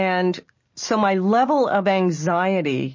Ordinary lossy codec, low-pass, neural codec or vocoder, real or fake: MP3, 32 kbps; 7.2 kHz; none; real